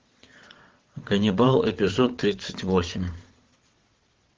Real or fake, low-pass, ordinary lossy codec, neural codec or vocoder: fake; 7.2 kHz; Opus, 16 kbps; vocoder, 22.05 kHz, 80 mel bands, WaveNeXt